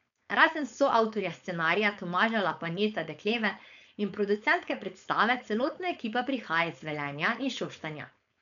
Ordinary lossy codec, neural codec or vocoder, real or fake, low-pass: none; codec, 16 kHz, 4.8 kbps, FACodec; fake; 7.2 kHz